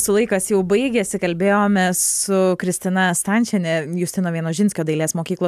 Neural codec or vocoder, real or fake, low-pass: none; real; 14.4 kHz